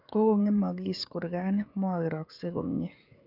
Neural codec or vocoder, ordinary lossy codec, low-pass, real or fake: codec, 16 kHz, 16 kbps, FreqCodec, smaller model; none; 5.4 kHz; fake